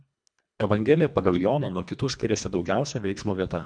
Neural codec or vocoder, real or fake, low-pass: codec, 24 kHz, 1.5 kbps, HILCodec; fake; 9.9 kHz